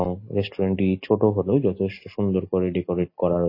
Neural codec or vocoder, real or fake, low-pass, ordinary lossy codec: none; real; 5.4 kHz; MP3, 24 kbps